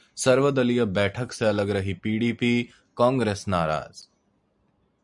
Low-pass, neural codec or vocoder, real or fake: 10.8 kHz; none; real